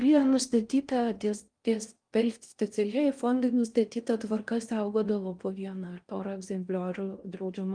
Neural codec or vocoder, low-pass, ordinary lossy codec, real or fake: codec, 16 kHz in and 24 kHz out, 0.6 kbps, FocalCodec, streaming, 4096 codes; 9.9 kHz; Opus, 32 kbps; fake